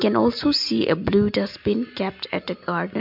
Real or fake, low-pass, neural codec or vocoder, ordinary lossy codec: real; 5.4 kHz; none; MP3, 48 kbps